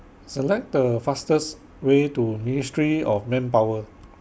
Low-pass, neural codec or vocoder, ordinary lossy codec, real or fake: none; none; none; real